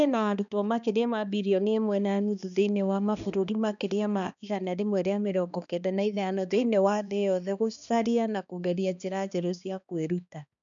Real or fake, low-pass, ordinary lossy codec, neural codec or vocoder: fake; 7.2 kHz; none; codec, 16 kHz, 2 kbps, X-Codec, HuBERT features, trained on balanced general audio